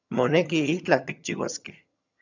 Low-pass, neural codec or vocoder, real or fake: 7.2 kHz; vocoder, 22.05 kHz, 80 mel bands, HiFi-GAN; fake